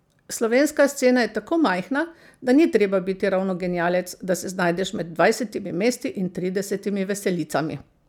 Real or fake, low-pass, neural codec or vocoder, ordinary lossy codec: real; 19.8 kHz; none; none